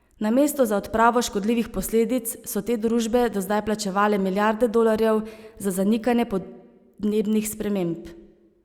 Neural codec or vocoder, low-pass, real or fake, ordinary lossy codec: none; 19.8 kHz; real; Opus, 64 kbps